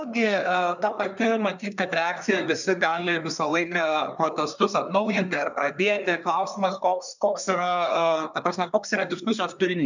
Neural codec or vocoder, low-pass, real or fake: codec, 24 kHz, 1 kbps, SNAC; 7.2 kHz; fake